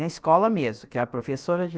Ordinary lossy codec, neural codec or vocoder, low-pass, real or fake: none; codec, 16 kHz, about 1 kbps, DyCAST, with the encoder's durations; none; fake